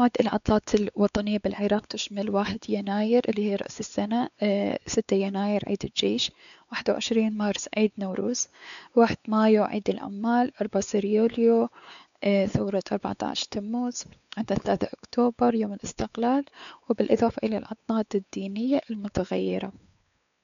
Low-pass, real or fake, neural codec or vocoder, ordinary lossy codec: 7.2 kHz; fake; codec, 16 kHz, 4 kbps, X-Codec, WavLM features, trained on Multilingual LibriSpeech; none